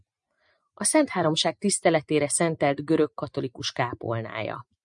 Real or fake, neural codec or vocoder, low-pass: real; none; 10.8 kHz